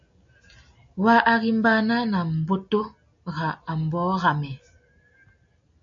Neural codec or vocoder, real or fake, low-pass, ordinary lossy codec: none; real; 7.2 kHz; MP3, 48 kbps